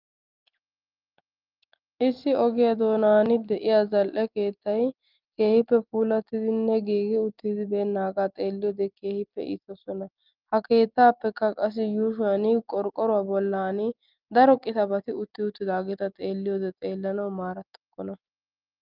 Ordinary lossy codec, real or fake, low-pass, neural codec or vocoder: Opus, 24 kbps; real; 5.4 kHz; none